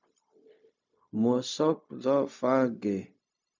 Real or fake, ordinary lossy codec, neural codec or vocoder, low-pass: fake; MP3, 64 kbps; codec, 16 kHz, 0.4 kbps, LongCat-Audio-Codec; 7.2 kHz